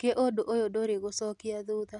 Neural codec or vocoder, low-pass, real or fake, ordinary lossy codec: none; 10.8 kHz; real; none